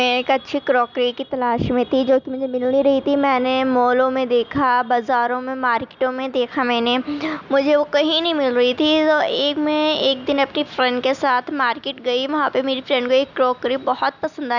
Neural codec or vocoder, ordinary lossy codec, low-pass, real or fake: none; none; 7.2 kHz; real